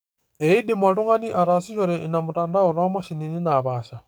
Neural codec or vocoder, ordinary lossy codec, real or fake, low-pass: codec, 44.1 kHz, 7.8 kbps, DAC; none; fake; none